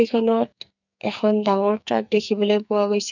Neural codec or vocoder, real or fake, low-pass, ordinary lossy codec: codec, 44.1 kHz, 2.6 kbps, SNAC; fake; 7.2 kHz; none